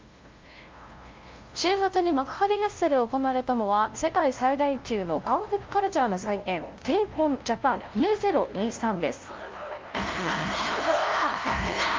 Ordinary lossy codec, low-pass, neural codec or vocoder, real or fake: Opus, 24 kbps; 7.2 kHz; codec, 16 kHz, 0.5 kbps, FunCodec, trained on LibriTTS, 25 frames a second; fake